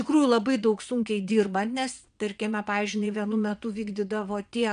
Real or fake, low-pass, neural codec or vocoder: fake; 9.9 kHz; vocoder, 22.05 kHz, 80 mel bands, Vocos